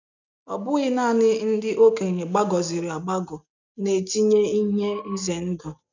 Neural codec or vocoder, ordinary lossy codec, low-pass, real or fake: none; none; 7.2 kHz; real